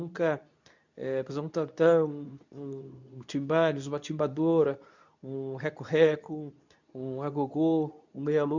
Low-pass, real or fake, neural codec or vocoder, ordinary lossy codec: 7.2 kHz; fake; codec, 24 kHz, 0.9 kbps, WavTokenizer, medium speech release version 2; none